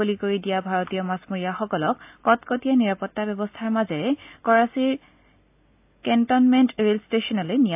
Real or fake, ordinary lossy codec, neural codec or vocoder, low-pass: real; AAC, 32 kbps; none; 3.6 kHz